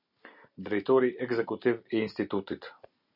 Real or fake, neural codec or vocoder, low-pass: real; none; 5.4 kHz